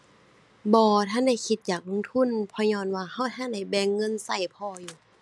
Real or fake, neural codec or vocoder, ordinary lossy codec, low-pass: real; none; none; none